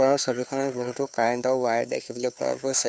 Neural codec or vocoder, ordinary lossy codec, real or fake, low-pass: codec, 16 kHz, 2 kbps, FunCodec, trained on Chinese and English, 25 frames a second; none; fake; none